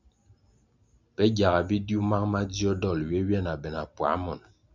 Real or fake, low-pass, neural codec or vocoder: real; 7.2 kHz; none